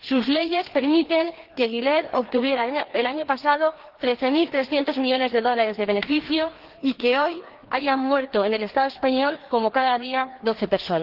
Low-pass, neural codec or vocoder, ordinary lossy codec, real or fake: 5.4 kHz; codec, 16 kHz, 2 kbps, FreqCodec, larger model; Opus, 16 kbps; fake